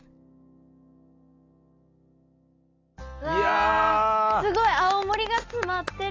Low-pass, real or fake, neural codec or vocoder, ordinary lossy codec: 7.2 kHz; real; none; AAC, 32 kbps